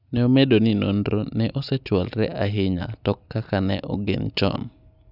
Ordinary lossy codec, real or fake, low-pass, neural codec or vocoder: none; real; 5.4 kHz; none